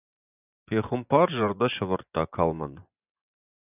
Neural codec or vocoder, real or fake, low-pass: vocoder, 24 kHz, 100 mel bands, Vocos; fake; 3.6 kHz